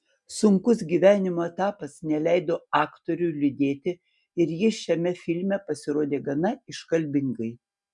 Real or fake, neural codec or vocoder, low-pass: real; none; 10.8 kHz